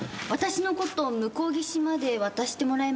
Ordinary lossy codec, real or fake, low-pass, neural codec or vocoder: none; real; none; none